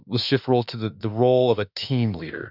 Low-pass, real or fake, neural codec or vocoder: 5.4 kHz; fake; autoencoder, 48 kHz, 32 numbers a frame, DAC-VAE, trained on Japanese speech